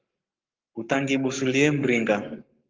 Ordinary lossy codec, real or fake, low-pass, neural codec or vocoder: Opus, 32 kbps; fake; 7.2 kHz; codec, 44.1 kHz, 7.8 kbps, Pupu-Codec